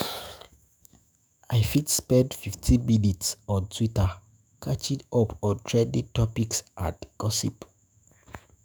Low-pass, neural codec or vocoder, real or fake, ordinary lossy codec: none; autoencoder, 48 kHz, 128 numbers a frame, DAC-VAE, trained on Japanese speech; fake; none